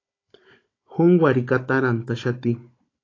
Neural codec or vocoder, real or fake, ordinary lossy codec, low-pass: codec, 16 kHz, 16 kbps, FunCodec, trained on Chinese and English, 50 frames a second; fake; AAC, 32 kbps; 7.2 kHz